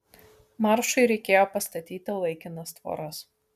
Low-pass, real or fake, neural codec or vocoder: 14.4 kHz; real; none